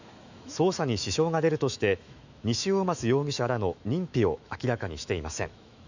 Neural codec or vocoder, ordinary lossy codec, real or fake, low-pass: autoencoder, 48 kHz, 128 numbers a frame, DAC-VAE, trained on Japanese speech; none; fake; 7.2 kHz